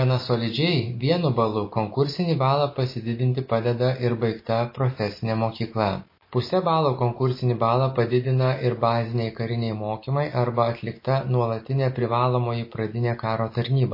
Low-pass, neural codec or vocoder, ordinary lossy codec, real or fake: 5.4 kHz; none; MP3, 24 kbps; real